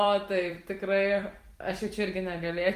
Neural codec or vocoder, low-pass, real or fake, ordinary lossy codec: vocoder, 44.1 kHz, 128 mel bands every 256 samples, BigVGAN v2; 14.4 kHz; fake; Opus, 24 kbps